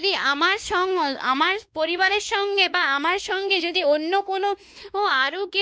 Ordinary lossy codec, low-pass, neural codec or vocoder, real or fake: none; none; codec, 16 kHz, 2 kbps, X-Codec, WavLM features, trained on Multilingual LibriSpeech; fake